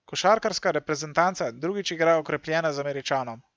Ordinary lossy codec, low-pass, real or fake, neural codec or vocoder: none; none; real; none